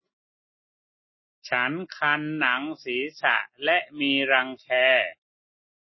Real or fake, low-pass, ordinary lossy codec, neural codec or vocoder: real; 7.2 kHz; MP3, 24 kbps; none